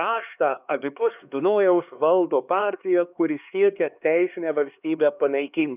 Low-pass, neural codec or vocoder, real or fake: 3.6 kHz; codec, 16 kHz, 2 kbps, X-Codec, HuBERT features, trained on LibriSpeech; fake